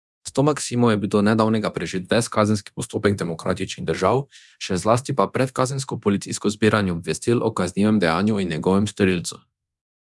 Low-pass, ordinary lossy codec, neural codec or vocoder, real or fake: none; none; codec, 24 kHz, 0.9 kbps, DualCodec; fake